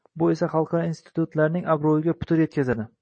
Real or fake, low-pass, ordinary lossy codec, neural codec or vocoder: fake; 9.9 kHz; MP3, 32 kbps; vocoder, 44.1 kHz, 128 mel bands every 256 samples, BigVGAN v2